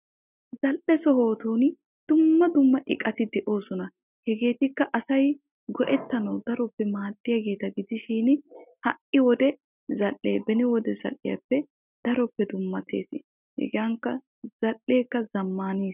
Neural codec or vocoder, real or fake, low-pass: none; real; 3.6 kHz